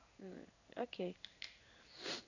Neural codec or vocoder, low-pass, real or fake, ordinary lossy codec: codec, 16 kHz in and 24 kHz out, 1 kbps, XY-Tokenizer; 7.2 kHz; fake; none